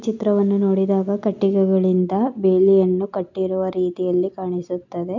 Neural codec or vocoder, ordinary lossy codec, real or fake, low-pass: none; none; real; 7.2 kHz